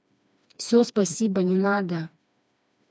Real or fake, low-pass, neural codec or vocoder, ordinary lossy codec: fake; none; codec, 16 kHz, 2 kbps, FreqCodec, smaller model; none